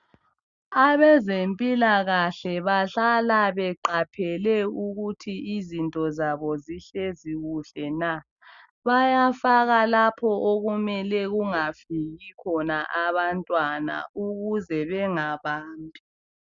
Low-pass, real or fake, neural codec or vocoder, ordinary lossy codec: 7.2 kHz; real; none; Opus, 64 kbps